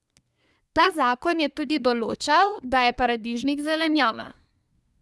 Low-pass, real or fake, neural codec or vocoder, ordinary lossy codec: none; fake; codec, 24 kHz, 1 kbps, SNAC; none